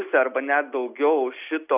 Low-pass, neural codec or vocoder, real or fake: 3.6 kHz; none; real